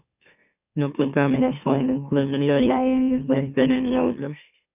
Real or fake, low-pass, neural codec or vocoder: fake; 3.6 kHz; autoencoder, 44.1 kHz, a latent of 192 numbers a frame, MeloTTS